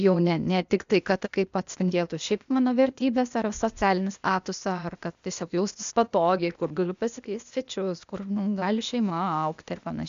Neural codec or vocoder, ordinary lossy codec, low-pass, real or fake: codec, 16 kHz, 0.8 kbps, ZipCodec; MP3, 64 kbps; 7.2 kHz; fake